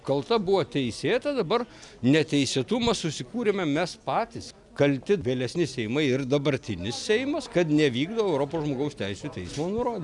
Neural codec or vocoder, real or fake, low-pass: none; real; 10.8 kHz